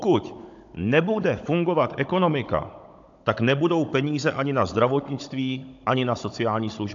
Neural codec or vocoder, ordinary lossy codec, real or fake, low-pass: codec, 16 kHz, 16 kbps, FunCodec, trained on Chinese and English, 50 frames a second; AAC, 64 kbps; fake; 7.2 kHz